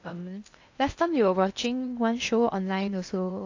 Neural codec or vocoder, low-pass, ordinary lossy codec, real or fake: codec, 16 kHz in and 24 kHz out, 0.8 kbps, FocalCodec, streaming, 65536 codes; 7.2 kHz; AAC, 48 kbps; fake